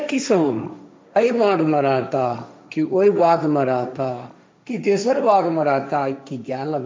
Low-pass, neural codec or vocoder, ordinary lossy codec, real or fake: none; codec, 16 kHz, 1.1 kbps, Voila-Tokenizer; none; fake